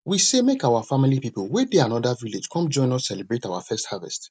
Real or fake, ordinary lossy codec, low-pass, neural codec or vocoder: real; none; none; none